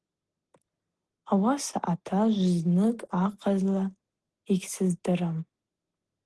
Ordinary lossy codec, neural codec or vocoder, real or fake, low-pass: Opus, 16 kbps; none; real; 10.8 kHz